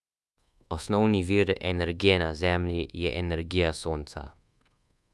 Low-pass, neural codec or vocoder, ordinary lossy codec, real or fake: none; codec, 24 kHz, 1.2 kbps, DualCodec; none; fake